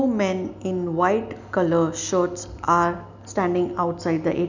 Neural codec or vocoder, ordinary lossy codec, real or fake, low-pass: none; none; real; 7.2 kHz